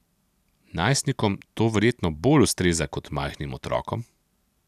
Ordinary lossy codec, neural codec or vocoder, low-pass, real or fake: none; none; 14.4 kHz; real